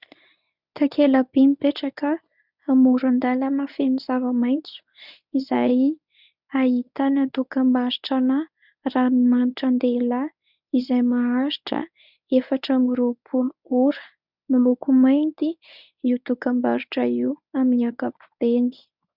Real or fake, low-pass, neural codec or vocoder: fake; 5.4 kHz; codec, 24 kHz, 0.9 kbps, WavTokenizer, medium speech release version 1